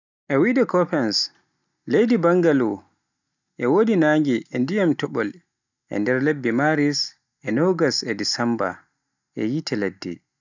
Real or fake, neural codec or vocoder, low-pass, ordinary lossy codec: real; none; 7.2 kHz; none